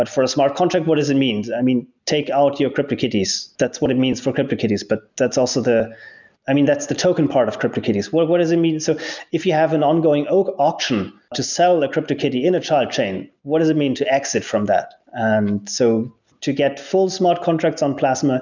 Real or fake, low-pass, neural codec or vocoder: real; 7.2 kHz; none